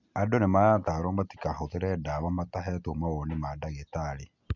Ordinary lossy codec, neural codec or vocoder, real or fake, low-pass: none; none; real; 7.2 kHz